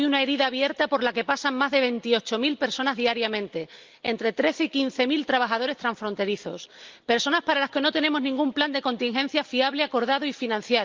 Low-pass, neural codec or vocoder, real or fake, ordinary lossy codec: 7.2 kHz; none; real; Opus, 32 kbps